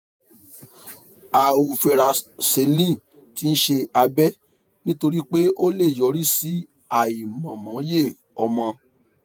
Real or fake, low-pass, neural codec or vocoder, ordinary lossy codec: fake; none; vocoder, 48 kHz, 128 mel bands, Vocos; none